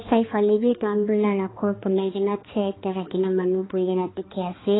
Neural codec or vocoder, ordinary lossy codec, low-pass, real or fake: codec, 16 kHz, 2 kbps, X-Codec, HuBERT features, trained on balanced general audio; AAC, 16 kbps; 7.2 kHz; fake